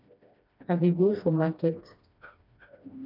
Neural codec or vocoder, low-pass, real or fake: codec, 16 kHz, 1 kbps, FreqCodec, smaller model; 5.4 kHz; fake